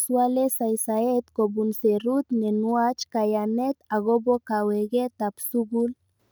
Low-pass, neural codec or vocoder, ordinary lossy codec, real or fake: none; none; none; real